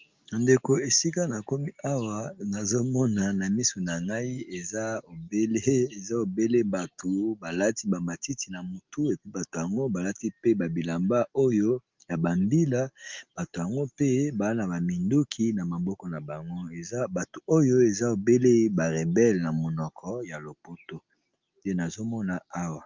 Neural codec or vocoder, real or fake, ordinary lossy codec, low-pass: none; real; Opus, 24 kbps; 7.2 kHz